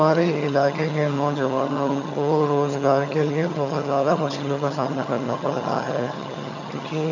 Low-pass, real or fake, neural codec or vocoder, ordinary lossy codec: 7.2 kHz; fake; vocoder, 22.05 kHz, 80 mel bands, HiFi-GAN; none